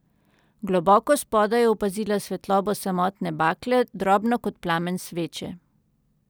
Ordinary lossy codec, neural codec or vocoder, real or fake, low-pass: none; none; real; none